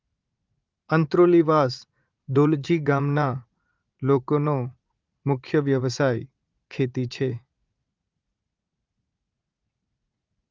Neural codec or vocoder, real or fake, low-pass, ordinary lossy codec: vocoder, 44.1 kHz, 80 mel bands, Vocos; fake; 7.2 kHz; Opus, 32 kbps